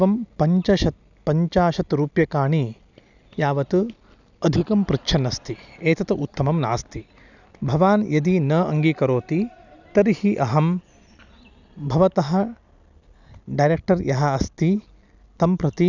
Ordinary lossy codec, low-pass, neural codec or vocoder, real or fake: none; 7.2 kHz; none; real